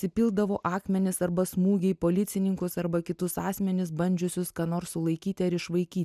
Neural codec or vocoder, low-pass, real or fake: none; 14.4 kHz; real